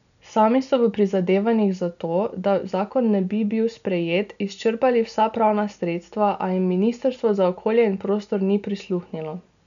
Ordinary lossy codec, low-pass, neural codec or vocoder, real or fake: none; 7.2 kHz; none; real